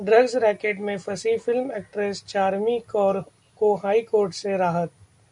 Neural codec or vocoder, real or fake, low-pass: none; real; 10.8 kHz